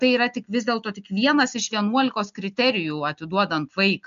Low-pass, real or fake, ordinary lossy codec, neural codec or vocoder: 7.2 kHz; real; AAC, 96 kbps; none